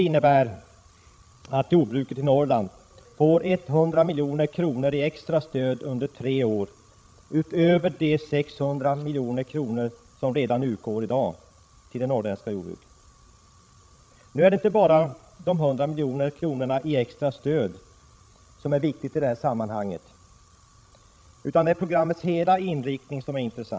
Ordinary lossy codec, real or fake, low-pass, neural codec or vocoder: none; fake; none; codec, 16 kHz, 16 kbps, FreqCodec, larger model